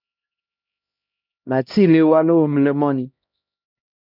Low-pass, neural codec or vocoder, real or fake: 5.4 kHz; codec, 16 kHz, 1 kbps, X-Codec, HuBERT features, trained on LibriSpeech; fake